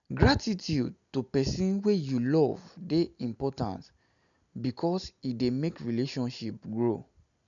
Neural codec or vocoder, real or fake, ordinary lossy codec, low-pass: none; real; none; 7.2 kHz